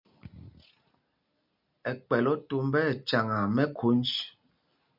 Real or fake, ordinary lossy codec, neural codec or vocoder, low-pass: real; MP3, 48 kbps; none; 5.4 kHz